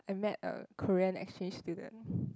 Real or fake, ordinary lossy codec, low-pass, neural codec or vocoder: real; none; none; none